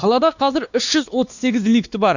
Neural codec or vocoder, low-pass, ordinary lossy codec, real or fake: codec, 16 kHz, 2 kbps, X-Codec, WavLM features, trained on Multilingual LibriSpeech; 7.2 kHz; none; fake